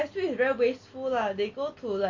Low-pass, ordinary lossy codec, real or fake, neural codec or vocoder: 7.2 kHz; MP3, 48 kbps; real; none